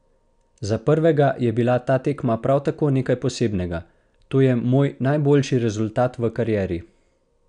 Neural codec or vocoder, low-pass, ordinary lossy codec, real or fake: none; 9.9 kHz; none; real